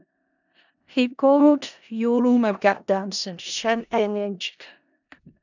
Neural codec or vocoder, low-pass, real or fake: codec, 16 kHz in and 24 kHz out, 0.4 kbps, LongCat-Audio-Codec, four codebook decoder; 7.2 kHz; fake